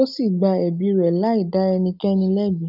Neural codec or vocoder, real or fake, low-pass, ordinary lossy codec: none; real; 5.4 kHz; none